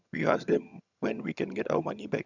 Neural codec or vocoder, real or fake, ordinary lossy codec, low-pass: vocoder, 22.05 kHz, 80 mel bands, HiFi-GAN; fake; none; 7.2 kHz